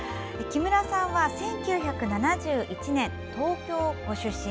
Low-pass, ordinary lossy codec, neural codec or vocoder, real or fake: none; none; none; real